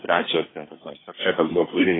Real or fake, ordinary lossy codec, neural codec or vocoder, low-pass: fake; AAC, 16 kbps; codec, 24 kHz, 1 kbps, SNAC; 7.2 kHz